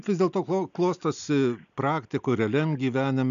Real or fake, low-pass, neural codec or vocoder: real; 7.2 kHz; none